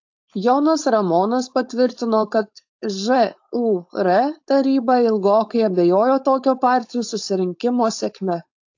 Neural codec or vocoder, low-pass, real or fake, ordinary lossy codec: codec, 16 kHz, 4.8 kbps, FACodec; 7.2 kHz; fake; AAC, 48 kbps